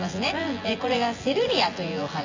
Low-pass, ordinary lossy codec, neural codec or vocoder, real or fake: 7.2 kHz; AAC, 48 kbps; vocoder, 24 kHz, 100 mel bands, Vocos; fake